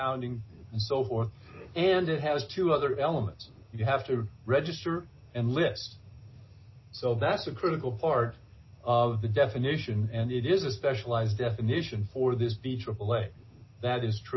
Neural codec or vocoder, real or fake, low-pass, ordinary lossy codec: none; real; 7.2 kHz; MP3, 24 kbps